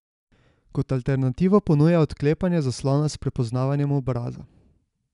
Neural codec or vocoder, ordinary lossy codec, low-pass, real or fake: none; none; 10.8 kHz; real